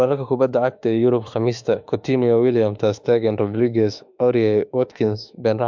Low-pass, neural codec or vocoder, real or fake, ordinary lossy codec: 7.2 kHz; autoencoder, 48 kHz, 32 numbers a frame, DAC-VAE, trained on Japanese speech; fake; MP3, 64 kbps